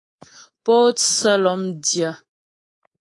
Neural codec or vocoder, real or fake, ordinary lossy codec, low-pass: codec, 24 kHz, 3.1 kbps, DualCodec; fake; AAC, 32 kbps; 10.8 kHz